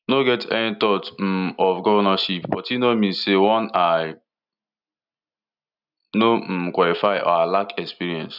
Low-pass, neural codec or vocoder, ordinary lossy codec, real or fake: 5.4 kHz; none; none; real